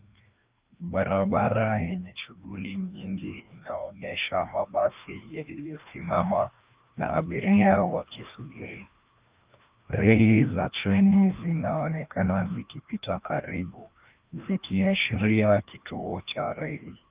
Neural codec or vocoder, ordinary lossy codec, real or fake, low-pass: codec, 16 kHz, 1 kbps, FreqCodec, larger model; Opus, 24 kbps; fake; 3.6 kHz